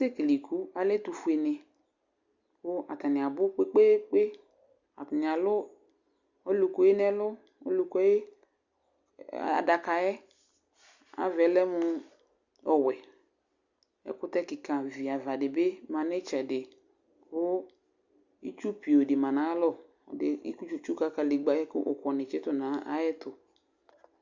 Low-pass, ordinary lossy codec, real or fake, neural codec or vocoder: 7.2 kHz; Opus, 64 kbps; real; none